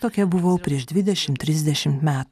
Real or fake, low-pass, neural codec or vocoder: real; 14.4 kHz; none